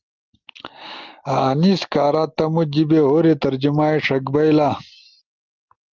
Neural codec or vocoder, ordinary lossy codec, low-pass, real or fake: none; Opus, 32 kbps; 7.2 kHz; real